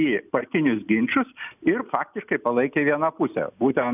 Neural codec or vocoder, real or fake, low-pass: none; real; 3.6 kHz